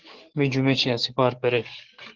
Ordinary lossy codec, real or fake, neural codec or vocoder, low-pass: Opus, 16 kbps; fake; codec, 44.1 kHz, 7.8 kbps, DAC; 7.2 kHz